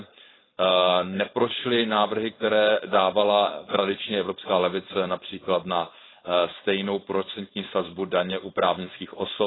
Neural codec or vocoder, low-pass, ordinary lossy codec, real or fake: codec, 16 kHz, 4.8 kbps, FACodec; 7.2 kHz; AAC, 16 kbps; fake